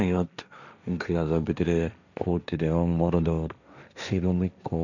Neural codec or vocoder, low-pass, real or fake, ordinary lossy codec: codec, 16 kHz, 1.1 kbps, Voila-Tokenizer; 7.2 kHz; fake; none